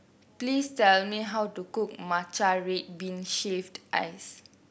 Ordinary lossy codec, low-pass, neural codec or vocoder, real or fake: none; none; none; real